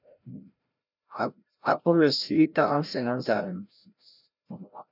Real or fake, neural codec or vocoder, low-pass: fake; codec, 16 kHz, 0.5 kbps, FreqCodec, larger model; 5.4 kHz